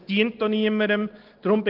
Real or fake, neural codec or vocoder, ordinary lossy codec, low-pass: real; none; Opus, 16 kbps; 5.4 kHz